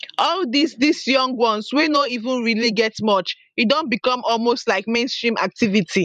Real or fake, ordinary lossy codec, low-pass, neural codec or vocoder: fake; MP3, 96 kbps; 14.4 kHz; vocoder, 44.1 kHz, 128 mel bands every 512 samples, BigVGAN v2